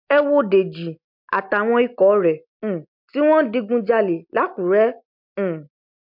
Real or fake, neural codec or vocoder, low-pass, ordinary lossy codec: real; none; 5.4 kHz; MP3, 48 kbps